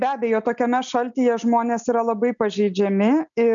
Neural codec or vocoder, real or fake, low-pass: none; real; 7.2 kHz